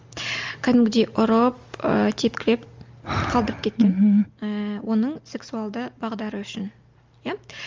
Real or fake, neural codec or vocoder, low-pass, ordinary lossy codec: real; none; 7.2 kHz; Opus, 32 kbps